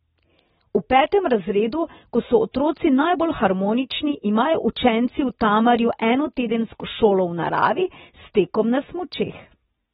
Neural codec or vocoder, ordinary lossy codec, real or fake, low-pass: none; AAC, 16 kbps; real; 7.2 kHz